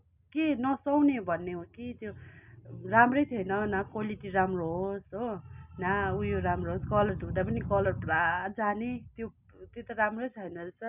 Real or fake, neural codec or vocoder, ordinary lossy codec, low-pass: real; none; none; 3.6 kHz